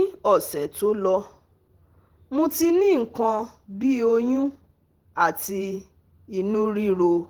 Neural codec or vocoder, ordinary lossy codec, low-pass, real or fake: vocoder, 48 kHz, 128 mel bands, Vocos; Opus, 16 kbps; 19.8 kHz; fake